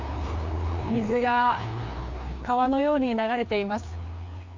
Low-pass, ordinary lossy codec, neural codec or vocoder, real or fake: 7.2 kHz; MP3, 48 kbps; codec, 16 kHz, 2 kbps, FreqCodec, larger model; fake